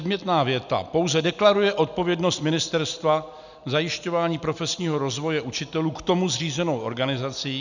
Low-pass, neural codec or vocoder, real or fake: 7.2 kHz; none; real